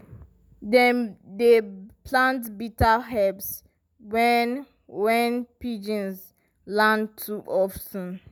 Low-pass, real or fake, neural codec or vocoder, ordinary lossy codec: none; real; none; none